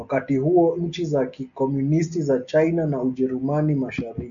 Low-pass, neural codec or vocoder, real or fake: 7.2 kHz; none; real